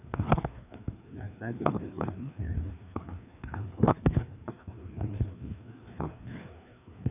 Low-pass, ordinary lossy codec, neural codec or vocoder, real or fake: 3.6 kHz; none; codec, 16 kHz, 2 kbps, FreqCodec, larger model; fake